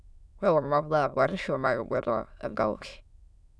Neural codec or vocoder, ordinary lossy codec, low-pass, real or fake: autoencoder, 22.05 kHz, a latent of 192 numbers a frame, VITS, trained on many speakers; none; none; fake